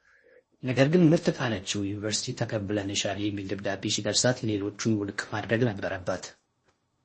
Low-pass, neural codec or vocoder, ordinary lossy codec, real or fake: 10.8 kHz; codec, 16 kHz in and 24 kHz out, 0.6 kbps, FocalCodec, streaming, 4096 codes; MP3, 32 kbps; fake